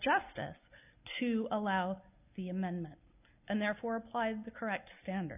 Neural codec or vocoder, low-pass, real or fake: none; 3.6 kHz; real